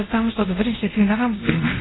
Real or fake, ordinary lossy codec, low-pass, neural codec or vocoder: fake; AAC, 16 kbps; 7.2 kHz; codec, 24 kHz, 0.5 kbps, DualCodec